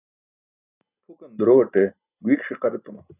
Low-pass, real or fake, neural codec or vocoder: 3.6 kHz; real; none